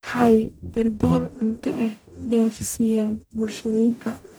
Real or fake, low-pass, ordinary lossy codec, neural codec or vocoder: fake; none; none; codec, 44.1 kHz, 0.9 kbps, DAC